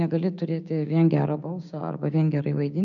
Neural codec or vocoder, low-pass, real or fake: codec, 16 kHz, 6 kbps, DAC; 7.2 kHz; fake